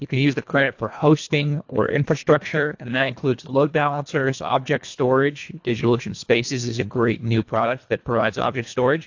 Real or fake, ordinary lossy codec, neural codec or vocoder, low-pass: fake; AAC, 48 kbps; codec, 24 kHz, 1.5 kbps, HILCodec; 7.2 kHz